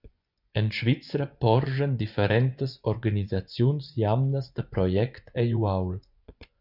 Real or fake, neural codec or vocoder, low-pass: fake; vocoder, 24 kHz, 100 mel bands, Vocos; 5.4 kHz